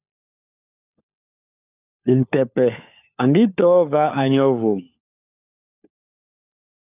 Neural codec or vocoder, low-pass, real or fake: codec, 16 kHz, 4 kbps, FunCodec, trained on LibriTTS, 50 frames a second; 3.6 kHz; fake